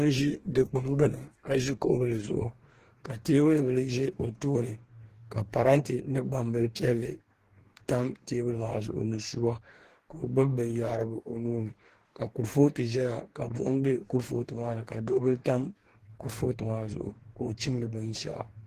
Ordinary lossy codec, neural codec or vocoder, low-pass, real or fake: Opus, 16 kbps; codec, 44.1 kHz, 2.6 kbps, DAC; 14.4 kHz; fake